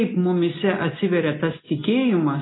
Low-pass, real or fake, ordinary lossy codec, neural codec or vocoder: 7.2 kHz; real; AAC, 16 kbps; none